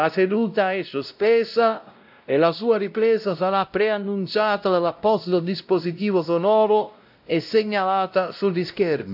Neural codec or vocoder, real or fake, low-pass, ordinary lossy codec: codec, 16 kHz, 0.5 kbps, X-Codec, WavLM features, trained on Multilingual LibriSpeech; fake; 5.4 kHz; AAC, 48 kbps